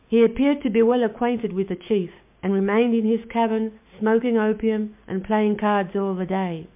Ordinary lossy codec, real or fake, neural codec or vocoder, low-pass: MP3, 32 kbps; fake; codec, 16 kHz, 6 kbps, DAC; 3.6 kHz